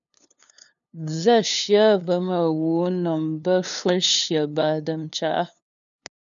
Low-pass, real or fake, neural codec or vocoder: 7.2 kHz; fake; codec, 16 kHz, 2 kbps, FunCodec, trained on LibriTTS, 25 frames a second